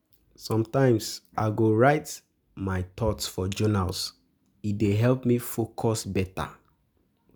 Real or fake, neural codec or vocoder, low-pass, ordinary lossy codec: real; none; none; none